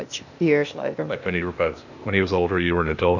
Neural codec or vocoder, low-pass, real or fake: codec, 16 kHz, 0.8 kbps, ZipCodec; 7.2 kHz; fake